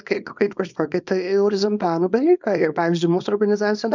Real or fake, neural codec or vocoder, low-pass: fake; codec, 24 kHz, 0.9 kbps, WavTokenizer, small release; 7.2 kHz